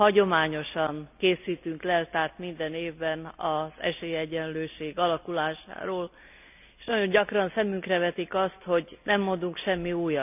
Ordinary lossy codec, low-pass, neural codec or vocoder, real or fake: none; 3.6 kHz; none; real